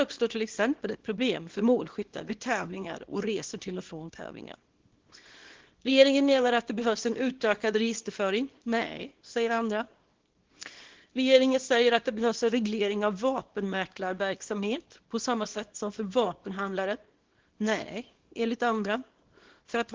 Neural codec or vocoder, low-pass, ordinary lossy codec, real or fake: codec, 24 kHz, 0.9 kbps, WavTokenizer, small release; 7.2 kHz; Opus, 16 kbps; fake